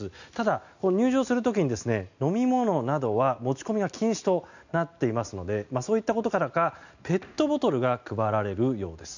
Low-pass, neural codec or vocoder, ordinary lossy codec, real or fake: 7.2 kHz; none; none; real